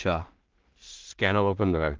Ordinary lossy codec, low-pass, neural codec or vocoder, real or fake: Opus, 32 kbps; 7.2 kHz; codec, 16 kHz in and 24 kHz out, 0.4 kbps, LongCat-Audio-Codec, two codebook decoder; fake